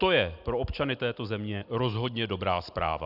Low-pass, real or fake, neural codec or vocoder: 5.4 kHz; real; none